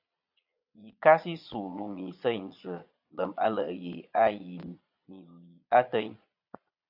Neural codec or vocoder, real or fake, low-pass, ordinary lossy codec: vocoder, 22.05 kHz, 80 mel bands, Vocos; fake; 5.4 kHz; AAC, 48 kbps